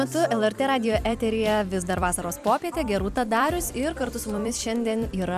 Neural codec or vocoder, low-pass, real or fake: vocoder, 44.1 kHz, 128 mel bands every 256 samples, BigVGAN v2; 14.4 kHz; fake